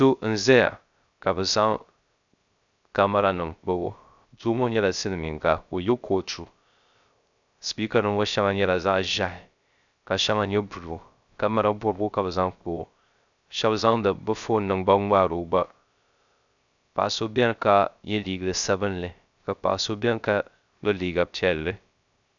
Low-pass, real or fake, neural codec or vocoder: 7.2 kHz; fake; codec, 16 kHz, 0.3 kbps, FocalCodec